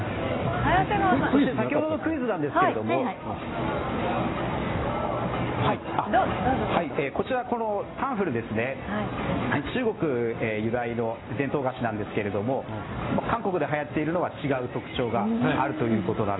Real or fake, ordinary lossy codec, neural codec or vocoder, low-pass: real; AAC, 16 kbps; none; 7.2 kHz